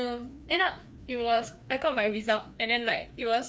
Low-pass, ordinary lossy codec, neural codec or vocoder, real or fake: none; none; codec, 16 kHz, 2 kbps, FreqCodec, larger model; fake